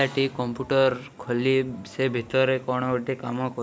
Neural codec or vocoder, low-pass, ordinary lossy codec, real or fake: none; none; none; real